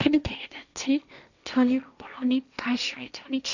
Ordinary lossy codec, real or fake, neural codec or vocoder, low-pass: none; fake; codec, 16 kHz, 1.1 kbps, Voila-Tokenizer; 7.2 kHz